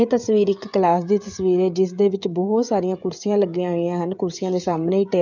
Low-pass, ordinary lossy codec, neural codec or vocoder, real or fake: 7.2 kHz; none; codec, 16 kHz, 16 kbps, FreqCodec, smaller model; fake